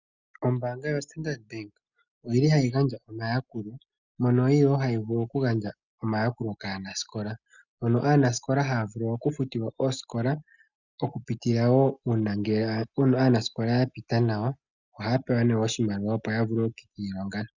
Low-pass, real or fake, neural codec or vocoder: 7.2 kHz; real; none